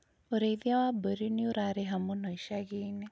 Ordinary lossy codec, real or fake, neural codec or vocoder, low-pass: none; real; none; none